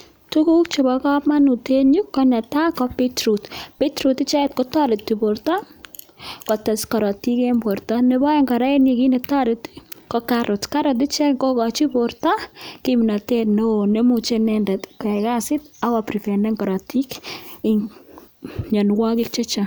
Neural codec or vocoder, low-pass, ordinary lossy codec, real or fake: none; none; none; real